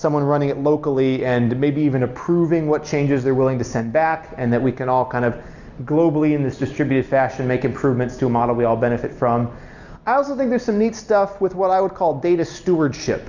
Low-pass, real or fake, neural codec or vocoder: 7.2 kHz; real; none